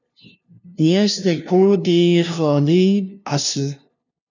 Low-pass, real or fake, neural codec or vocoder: 7.2 kHz; fake; codec, 16 kHz, 0.5 kbps, FunCodec, trained on LibriTTS, 25 frames a second